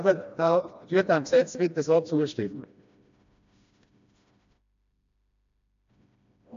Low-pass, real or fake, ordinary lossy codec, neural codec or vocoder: 7.2 kHz; fake; AAC, 64 kbps; codec, 16 kHz, 1 kbps, FreqCodec, smaller model